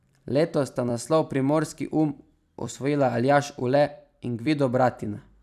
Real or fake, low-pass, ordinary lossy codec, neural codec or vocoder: fake; 14.4 kHz; none; vocoder, 44.1 kHz, 128 mel bands every 256 samples, BigVGAN v2